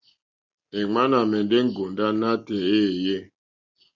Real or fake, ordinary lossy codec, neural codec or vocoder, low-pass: real; Opus, 64 kbps; none; 7.2 kHz